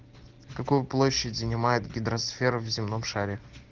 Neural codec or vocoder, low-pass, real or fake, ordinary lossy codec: none; 7.2 kHz; real; Opus, 16 kbps